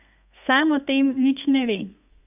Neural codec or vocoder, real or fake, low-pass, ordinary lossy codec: codec, 32 kHz, 1.9 kbps, SNAC; fake; 3.6 kHz; none